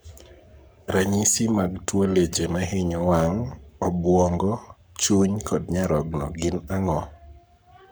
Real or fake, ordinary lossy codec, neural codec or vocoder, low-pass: fake; none; codec, 44.1 kHz, 7.8 kbps, Pupu-Codec; none